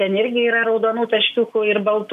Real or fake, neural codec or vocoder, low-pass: real; none; 14.4 kHz